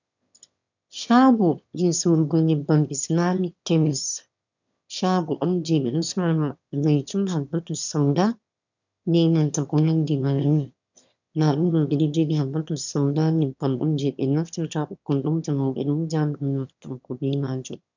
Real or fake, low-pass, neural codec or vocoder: fake; 7.2 kHz; autoencoder, 22.05 kHz, a latent of 192 numbers a frame, VITS, trained on one speaker